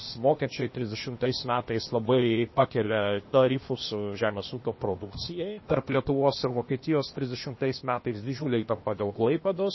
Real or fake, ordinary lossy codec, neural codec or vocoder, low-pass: fake; MP3, 24 kbps; codec, 16 kHz, 0.8 kbps, ZipCodec; 7.2 kHz